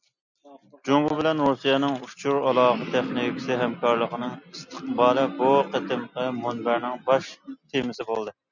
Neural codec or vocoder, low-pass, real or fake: none; 7.2 kHz; real